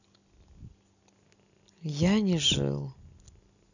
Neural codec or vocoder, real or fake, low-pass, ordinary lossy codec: none; real; 7.2 kHz; none